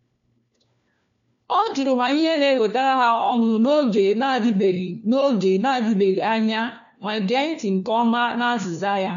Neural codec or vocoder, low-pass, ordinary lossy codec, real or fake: codec, 16 kHz, 1 kbps, FunCodec, trained on LibriTTS, 50 frames a second; 7.2 kHz; none; fake